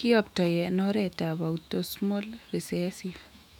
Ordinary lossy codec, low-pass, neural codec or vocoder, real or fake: none; 19.8 kHz; autoencoder, 48 kHz, 128 numbers a frame, DAC-VAE, trained on Japanese speech; fake